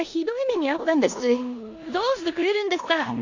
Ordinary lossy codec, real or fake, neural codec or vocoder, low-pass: AAC, 48 kbps; fake; codec, 16 kHz in and 24 kHz out, 0.9 kbps, LongCat-Audio-Codec, four codebook decoder; 7.2 kHz